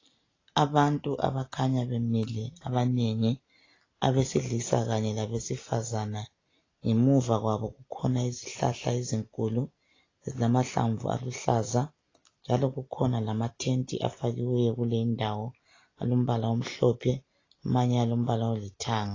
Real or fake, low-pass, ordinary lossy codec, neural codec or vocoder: real; 7.2 kHz; AAC, 32 kbps; none